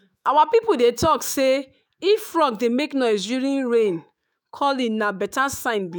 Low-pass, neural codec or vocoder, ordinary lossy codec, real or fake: none; autoencoder, 48 kHz, 128 numbers a frame, DAC-VAE, trained on Japanese speech; none; fake